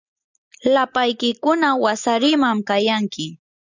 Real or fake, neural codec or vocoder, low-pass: real; none; 7.2 kHz